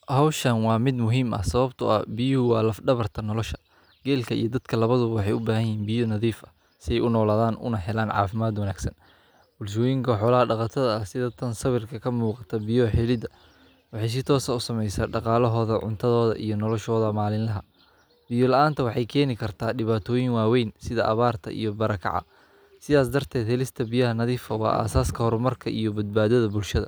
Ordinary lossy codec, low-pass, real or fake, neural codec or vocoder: none; none; real; none